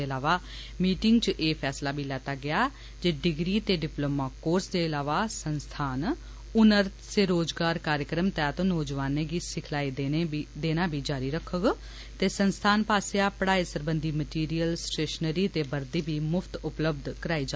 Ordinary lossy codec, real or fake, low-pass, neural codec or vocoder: none; real; none; none